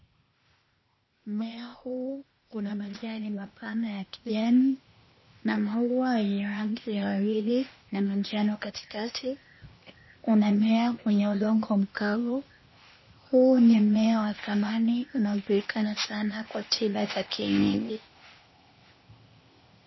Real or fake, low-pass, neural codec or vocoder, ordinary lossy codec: fake; 7.2 kHz; codec, 16 kHz, 0.8 kbps, ZipCodec; MP3, 24 kbps